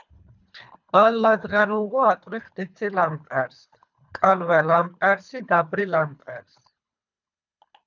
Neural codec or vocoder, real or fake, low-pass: codec, 24 kHz, 3 kbps, HILCodec; fake; 7.2 kHz